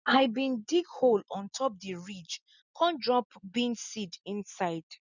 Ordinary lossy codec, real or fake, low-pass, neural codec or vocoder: none; real; 7.2 kHz; none